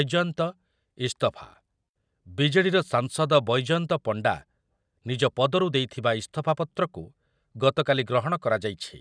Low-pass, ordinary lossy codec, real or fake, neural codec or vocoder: 9.9 kHz; none; real; none